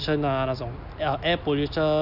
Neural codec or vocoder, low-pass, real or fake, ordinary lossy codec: none; 5.4 kHz; real; none